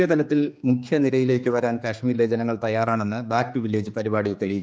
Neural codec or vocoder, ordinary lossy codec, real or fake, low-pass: codec, 16 kHz, 2 kbps, X-Codec, HuBERT features, trained on general audio; none; fake; none